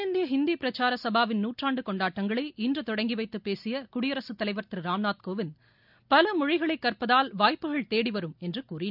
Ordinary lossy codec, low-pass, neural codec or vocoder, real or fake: none; 5.4 kHz; none; real